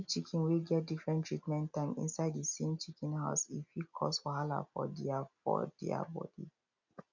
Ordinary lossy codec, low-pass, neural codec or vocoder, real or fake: none; 7.2 kHz; none; real